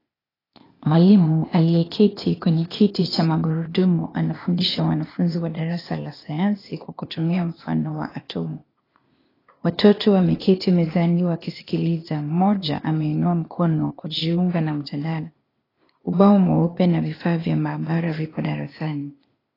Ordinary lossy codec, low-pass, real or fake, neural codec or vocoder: AAC, 24 kbps; 5.4 kHz; fake; codec, 16 kHz, 0.8 kbps, ZipCodec